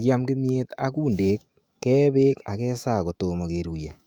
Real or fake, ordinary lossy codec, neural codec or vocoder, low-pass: fake; Opus, 64 kbps; autoencoder, 48 kHz, 128 numbers a frame, DAC-VAE, trained on Japanese speech; 19.8 kHz